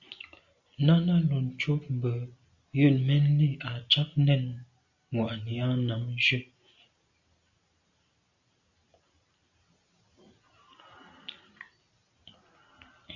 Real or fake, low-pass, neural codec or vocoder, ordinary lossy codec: real; 7.2 kHz; none; Opus, 64 kbps